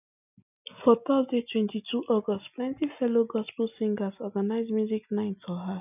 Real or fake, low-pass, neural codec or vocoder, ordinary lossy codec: real; 3.6 kHz; none; none